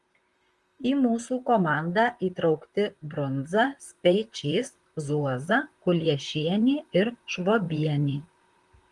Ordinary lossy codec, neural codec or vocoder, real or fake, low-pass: Opus, 24 kbps; vocoder, 44.1 kHz, 128 mel bands, Pupu-Vocoder; fake; 10.8 kHz